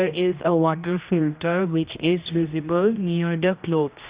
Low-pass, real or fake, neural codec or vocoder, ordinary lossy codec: 3.6 kHz; fake; codec, 16 kHz, 1 kbps, X-Codec, HuBERT features, trained on general audio; Opus, 64 kbps